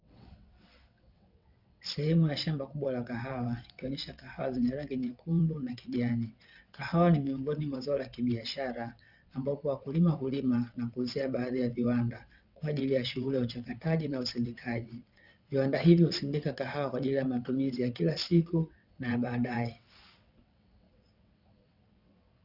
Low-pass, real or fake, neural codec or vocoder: 5.4 kHz; fake; vocoder, 22.05 kHz, 80 mel bands, WaveNeXt